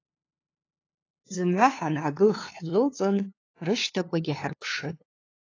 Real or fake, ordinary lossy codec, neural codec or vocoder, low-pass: fake; AAC, 32 kbps; codec, 16 kHz, 2 kbps, FunCodec, trained on LibriTTS, 25 frames a second; 7.2 kHz